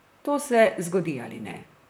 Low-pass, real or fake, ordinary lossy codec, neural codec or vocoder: none; fake; none; vocoder, 44.1 kHz, 128 mel bands, Pupu-Vocoder